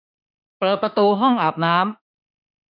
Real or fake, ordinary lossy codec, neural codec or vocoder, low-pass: fake; none; autoencoder, 48 kHz, 32 numbers a frame, DAC-VAE, trained on Japanese speech; 5.4 kHz